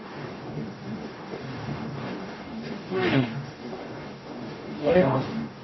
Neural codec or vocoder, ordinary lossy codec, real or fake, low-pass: codec, 44.1 kHz, 0.9 kbps, DAC; MP3, 24 kbps; fake; 7.2 kHz